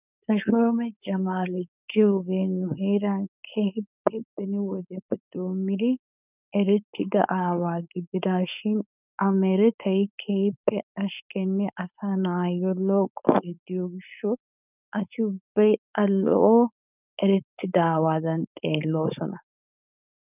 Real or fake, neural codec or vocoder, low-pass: fake; codec, 16 kHz, 4.8 kbps, FACodec; 3.6 kHz